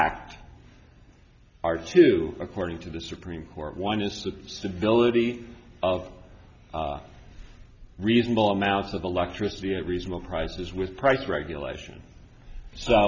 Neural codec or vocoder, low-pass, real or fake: none; 7.2 kHz; real